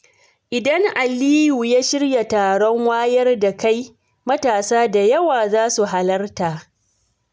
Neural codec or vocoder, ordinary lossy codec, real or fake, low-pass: none; none; real; none